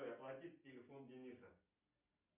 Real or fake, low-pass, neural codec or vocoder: real; 3.6 kHz; none